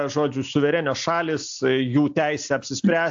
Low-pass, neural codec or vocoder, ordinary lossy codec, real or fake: 7.2 kHz; none; AAC, 64 kbps; real